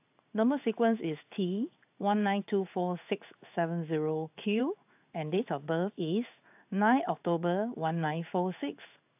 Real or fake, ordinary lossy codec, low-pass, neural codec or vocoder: fake; AAC, 32 kbps; 3.6 kHz; codec, 16 kHz in and 24 kHz out, 1 kbps, XY-Tokenizer